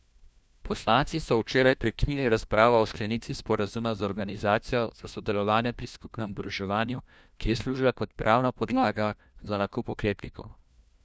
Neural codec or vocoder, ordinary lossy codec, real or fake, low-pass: codec, 16 kHz, 1 kbps, FunCodec, trained on LibriTTS, 50 frames a second; none; fake; none